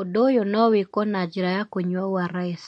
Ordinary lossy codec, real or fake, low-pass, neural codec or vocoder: MP3, 48 kbps; real; 14.4 kHz; none